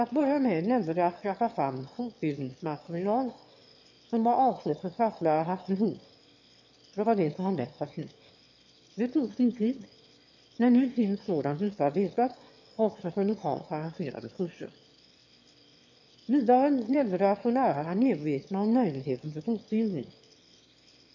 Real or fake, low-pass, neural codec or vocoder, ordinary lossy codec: fake; 7.2 kHz; autoencoder, 22.05 kHz, a latent of 192 numbers a frame, VITS, trained on one speaker; MP3, 48 kbps